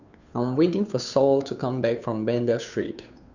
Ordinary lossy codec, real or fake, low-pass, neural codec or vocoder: none; fake; 7.2 kHz; codec, 16 kHz, 2 kbps, FunCodec, trained on Chinese and English, 25 frames a second